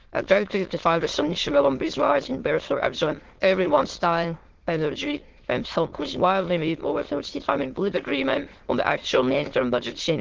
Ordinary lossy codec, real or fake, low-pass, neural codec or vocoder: Opus, 16 kbps; fake; 7.2 kHz; autoencoder, 22.05 kHz, a latent of 192 numbers a frame, VITS, trained on many speakers